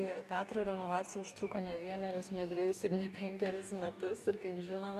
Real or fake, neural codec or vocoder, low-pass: fake; codec, 44.1 kHz, 2.6 kbps, DAC; 14.4 kHz